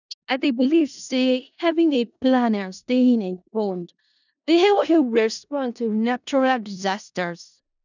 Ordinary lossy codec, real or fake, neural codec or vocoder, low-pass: none; fake; codec, 16 kHz in and 24 kHz out, 0.4 kbps, LongCat-Audio-Codec, four codebook decoder; 7.2 kHz